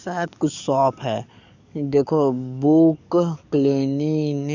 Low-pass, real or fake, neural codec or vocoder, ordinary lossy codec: 7.2 kHz; fake; codec, 44.1 kHz, 7.8 kbps, DAC; none